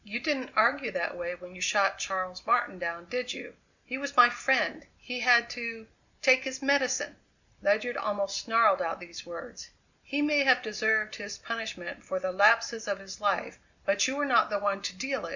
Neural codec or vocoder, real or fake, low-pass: none; real; 7.2 kHz